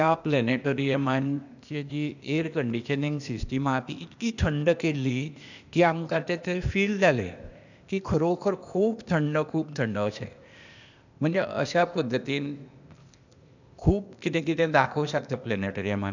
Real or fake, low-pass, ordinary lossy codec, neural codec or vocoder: fake; 7.2 kHz; none; codec, 16 kHz, 0.8 kbps, ZipCodec